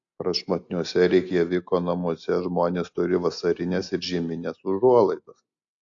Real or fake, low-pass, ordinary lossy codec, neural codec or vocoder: real; 7.2 kHz; AAC, 48 kbps; none